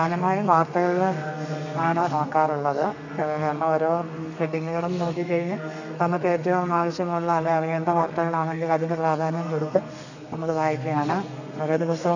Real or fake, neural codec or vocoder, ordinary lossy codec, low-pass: fake; codec, 32 kHz, 1.9 kbps, SNAC; none; 7.2 kHz